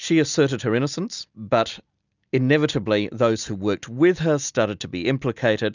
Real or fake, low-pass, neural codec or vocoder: real; 7.2 kHz; none